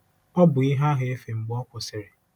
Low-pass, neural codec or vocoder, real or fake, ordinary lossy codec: 19.8 kHz; none; real; none